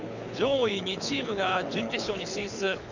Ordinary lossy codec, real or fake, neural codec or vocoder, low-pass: none; fake; codec, 24 kHz, 6 kbps, HILCodec; 7.2 kHz